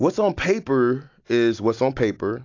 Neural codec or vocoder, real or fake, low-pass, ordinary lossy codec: none; real; 7.2 kHz; AAC, 48 kbps